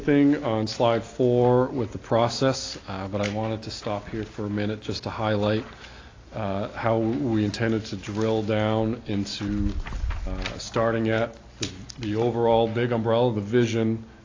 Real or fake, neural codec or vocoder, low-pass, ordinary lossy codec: real; none; 7.2 kHz; AAC, 32 kbps